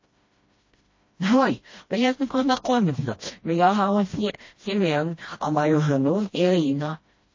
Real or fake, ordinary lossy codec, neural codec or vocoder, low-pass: fake; MP3, 32 kbps; codec, 16 kHz, 1 kbps, FreqCodec, smaller model; 7.2 kHz